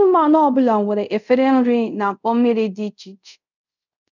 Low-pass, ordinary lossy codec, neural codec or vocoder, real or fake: 7.2 kHz; none; codec, 24 kHz, 0.5 kbps, DualCodec; fake